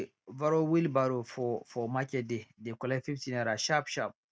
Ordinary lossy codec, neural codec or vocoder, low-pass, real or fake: none; none; none; real